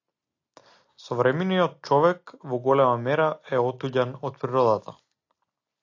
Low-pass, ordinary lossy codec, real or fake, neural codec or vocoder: 7.2 kHz; AAC, 48 kbps; real; none